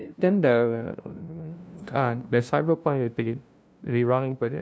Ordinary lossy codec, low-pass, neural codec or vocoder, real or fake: none; none; codec, 16 kHz, 0.5 kbps, FunCodec, trained on LibriTTS, 25 frames a second; fake